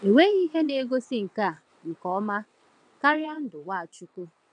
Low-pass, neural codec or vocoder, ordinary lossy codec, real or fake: 9.9 kHz; vocoder, 22.05 kHz, 80 mel bands, WaveNeXt; none; fake